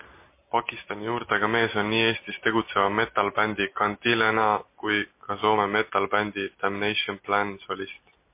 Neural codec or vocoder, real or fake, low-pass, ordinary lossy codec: none; real; 3.6 kHz; MP3, 24 kbps